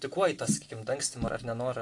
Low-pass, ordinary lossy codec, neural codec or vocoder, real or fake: 10.8 kHz; AAC, 48 kbps; none; real